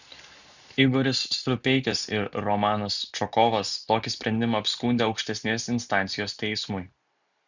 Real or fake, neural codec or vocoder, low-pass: real; none; 7.2 kHz